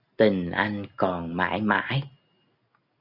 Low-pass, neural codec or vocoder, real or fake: 5.4 kHz; none; real